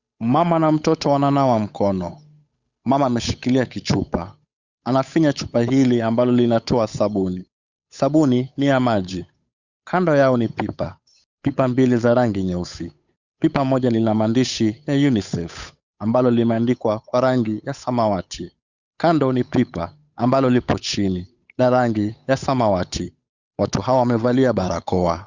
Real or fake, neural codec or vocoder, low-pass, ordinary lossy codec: fake; codec, 16 kHz, 8 kbps, FunCodec, trained on Chinese and English, 25 frames a second; 7.2 kHz; Opus, 64 kbps